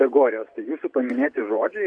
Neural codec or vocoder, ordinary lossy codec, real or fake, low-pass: vocoder, 24 kHz, 100 mel bands, Vocos; Opus, 64 kbps; fake; 9.9 kHz